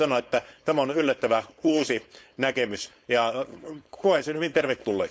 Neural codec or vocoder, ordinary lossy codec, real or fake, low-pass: codec, 16 kHz, 4.8 kbps, FACodec; none; fake; none